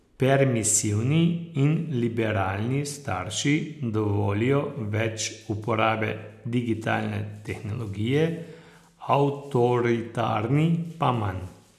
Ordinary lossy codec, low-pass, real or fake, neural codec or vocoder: none; 14.4 kHz; real; none